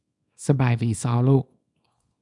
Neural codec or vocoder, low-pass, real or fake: codec, 24 kHz, 0.9 kbps, WavTokenizer, small release; 10.8 kHz; fake